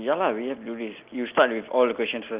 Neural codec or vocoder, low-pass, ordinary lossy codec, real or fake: none; 3.6 kHz; Opus, 32 kbps; real